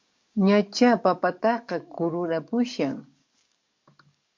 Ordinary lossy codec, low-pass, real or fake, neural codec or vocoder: MP3, 64 kbps; 7.2 kHz; fake; codec, 44.1 kHz, 7.8 kbps, DAC